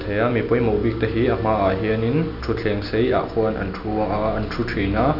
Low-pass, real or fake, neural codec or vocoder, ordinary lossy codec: 5.4 kHz; real; none; none